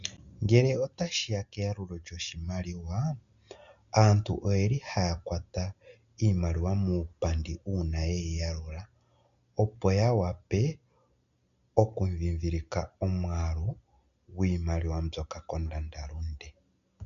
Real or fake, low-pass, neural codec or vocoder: real; 7.2 kHz; none